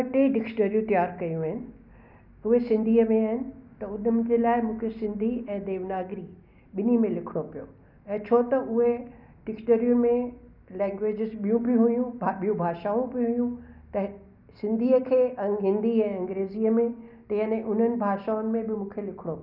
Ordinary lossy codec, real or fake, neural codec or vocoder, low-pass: none; real; none; 5.4 kHz